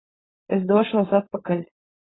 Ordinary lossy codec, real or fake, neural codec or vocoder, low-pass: AAC, 16 kbps; fake; codec, 16 kHz, 4.8 kbps, FACodec; 7.2 kHz